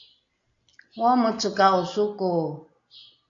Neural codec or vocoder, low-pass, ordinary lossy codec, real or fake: none; 7.2 kHz; AAC, 48 kbps; real